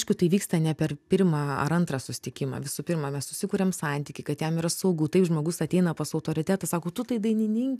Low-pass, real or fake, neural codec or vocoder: 14.4 kHz; real; none